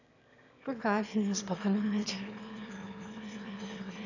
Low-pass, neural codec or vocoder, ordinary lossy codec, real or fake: 7.2 kHz; autoencoder, 22.05 kHz, a latent of 192 numbers a frame, VITS, trained on one speaker; none; fake